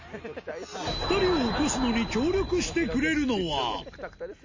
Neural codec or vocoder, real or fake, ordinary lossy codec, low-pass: none; real; MP3, 32 kbps; 7.2 kHz